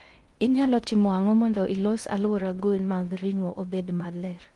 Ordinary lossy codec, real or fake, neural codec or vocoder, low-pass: Opus, 24 kbps; fake; codec, 16 kHz in and 24 kHz out, 0.6 kbps, FocalCodec, streaming, 2048 codes; 10.8 kHz